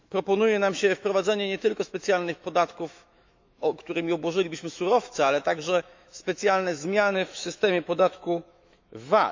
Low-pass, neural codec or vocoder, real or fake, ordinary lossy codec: 7.2 kHz; autoencoder, 48 kHz, 128 numbers a frame, DAC-VAE, trained on Japanese speech; fake; MP3, 64 kbps